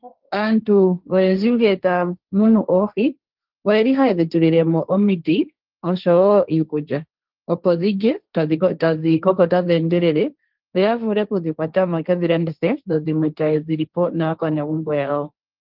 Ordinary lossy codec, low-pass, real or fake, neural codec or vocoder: Opus, 32 kbps; 5.4 kHz; fake; codec, 16 kHz, 1.1 kbps, Voila-Tokenizer